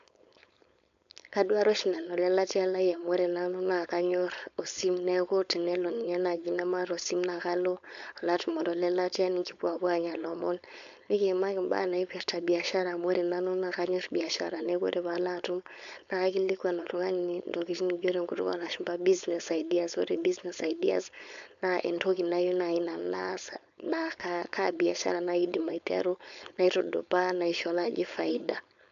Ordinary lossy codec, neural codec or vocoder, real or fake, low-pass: AAC, 96 kbps; codec, 16 kHz, 4.8 kbps, FACodec; fake; 7.2 kHz